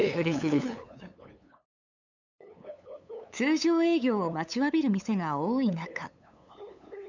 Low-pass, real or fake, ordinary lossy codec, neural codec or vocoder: 7.2 kHz; fake; none; codec, 16 kHz, 8 kbps, FunCodec, trained on LibriTTS, 25 frames a second